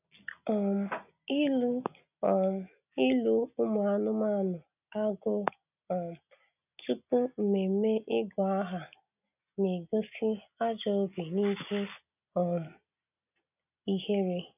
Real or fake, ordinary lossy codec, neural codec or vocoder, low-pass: real; none; none; 3.6 kHz